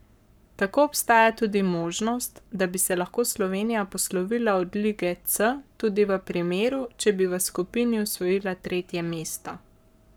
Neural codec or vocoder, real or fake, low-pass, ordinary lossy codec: codec, 44.1 kHz, 7.8 kbps, Pupu-Codec; fake; none; none